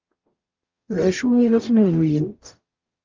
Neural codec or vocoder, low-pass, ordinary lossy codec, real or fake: codec, 44.1 kHz, 0.9 kbps, DAC; 7.2 kHz; Opus, 32 kbps; fake